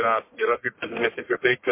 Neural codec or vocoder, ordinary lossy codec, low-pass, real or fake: codec, 44.1 kHz, 1.7 kbps, Pupu-Codec; MP3, 24 kbps; 3.6 kHz; fake